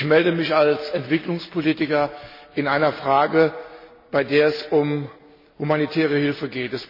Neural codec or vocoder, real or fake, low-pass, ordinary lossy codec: none; real; 5.4 kHz; MP3, 24 kbps